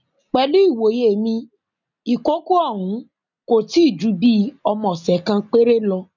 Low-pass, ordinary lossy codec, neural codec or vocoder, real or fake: 7.2 kHz; none; none; real